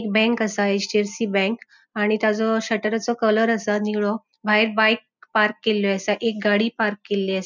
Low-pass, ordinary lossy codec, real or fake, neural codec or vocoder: 7.2 kHz; none; real; none